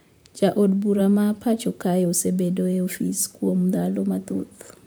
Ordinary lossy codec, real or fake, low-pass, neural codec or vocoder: none; fake; none; vocoder, 44.1 kHz, 128 mel bands, Pupu-Vocoder